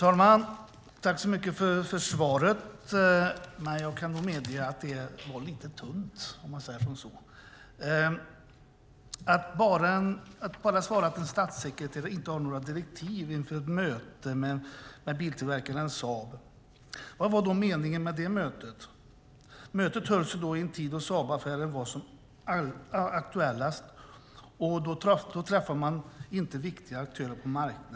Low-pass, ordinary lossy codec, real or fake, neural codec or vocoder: none; none; real; none